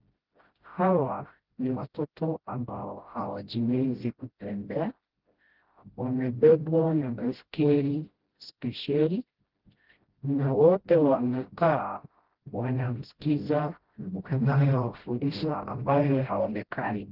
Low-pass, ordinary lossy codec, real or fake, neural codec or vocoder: 5.4 kHz; Opus, 16 kbps; fake; codec, 16 kHz, 0.5 kbps, FreqCodec, smaller model